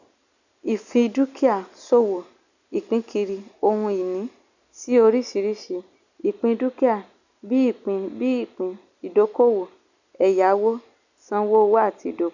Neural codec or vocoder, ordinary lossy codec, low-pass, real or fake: none; none; 7.2 kHz; real